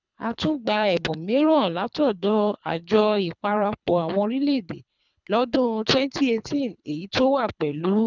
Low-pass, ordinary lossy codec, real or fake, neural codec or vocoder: 7.2 kHz; none; fake; codec, 24 kHz, 3 kbps, HILCodec